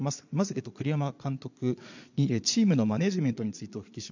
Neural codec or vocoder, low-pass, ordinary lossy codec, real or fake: vocoder, 22.05 kHz, 80 mel bands, Vocos; 7.2 kHz; none; fake